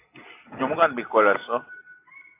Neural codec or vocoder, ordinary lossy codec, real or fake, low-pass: none; AAC, 24 kbps; real; 3.6 kHz